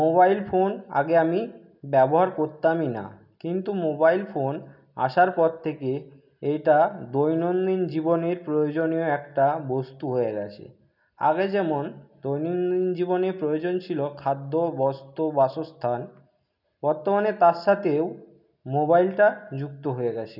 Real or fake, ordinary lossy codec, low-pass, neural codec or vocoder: real; none; 5.4 kHz; none